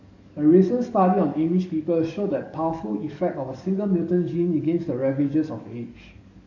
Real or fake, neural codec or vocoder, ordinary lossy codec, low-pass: fake; codec, 44.1 kHz, 7.8 kbps, DAC; none; 7.2 kHz